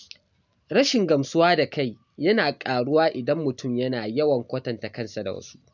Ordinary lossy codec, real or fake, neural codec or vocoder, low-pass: none; real; none; 7.2 kHz